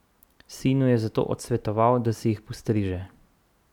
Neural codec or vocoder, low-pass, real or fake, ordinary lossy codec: none; 19.8 kHz; real; none